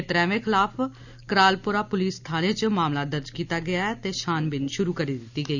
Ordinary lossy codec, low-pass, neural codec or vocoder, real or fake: none; 7.2 kHz; none; real